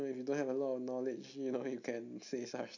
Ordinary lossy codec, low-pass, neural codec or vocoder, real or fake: none; 7.2 kHz; none; real